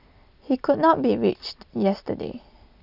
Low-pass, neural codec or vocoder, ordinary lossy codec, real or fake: 5.4 kHz; none; none; real